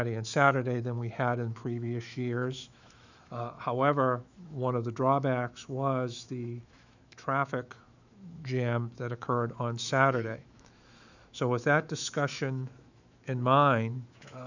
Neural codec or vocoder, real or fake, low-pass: autoencoder, 48 kHz, 128 numbers a frame, DAC-VAE, trained on Japanese speech; fake; 7.2 kHz